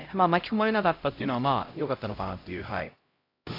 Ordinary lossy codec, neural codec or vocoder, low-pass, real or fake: AAC, 32 kbps; codec, 16 kHz, 0.5 kbps, X-Codec, HuBERT features, trained on LibriSpeech; 5.4 kHz; fake